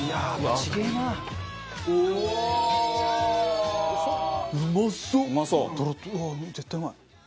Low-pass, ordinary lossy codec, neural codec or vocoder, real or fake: none; none; none; real